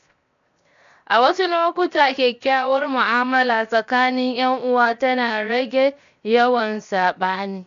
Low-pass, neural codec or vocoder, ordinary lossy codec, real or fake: 7.2 kHz; codec, 16 kHz, 0.7 kbps, FocalCodec; MP3, 64 kbps; fake